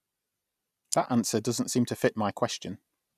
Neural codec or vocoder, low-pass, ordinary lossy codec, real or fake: none; 14.4 kHz; none; real